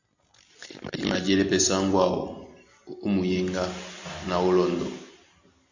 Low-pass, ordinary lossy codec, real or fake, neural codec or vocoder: 7.2 kHz; AAC, 48 kbps; real; none